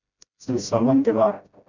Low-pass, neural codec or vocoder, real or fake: 7.2 kHz; codec, 16 kHz, 0.5 kbps, FreqCodec, smaller model; fake